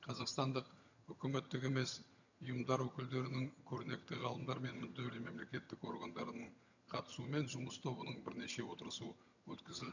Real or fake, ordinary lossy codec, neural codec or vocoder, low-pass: fake; none; vocoder, 22.05 kHz, 80 mel bands, HiFi-GAN; 7.2 kHz